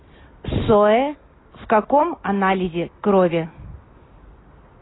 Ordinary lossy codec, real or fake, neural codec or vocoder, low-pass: AAC, 16 kbps; real; none; 7.2 kHz